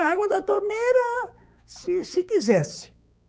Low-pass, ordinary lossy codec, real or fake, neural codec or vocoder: none; none; fake; codec, 16 kHz, 4 kbps, X-Codec, HuBERT features, trained on balanced general audio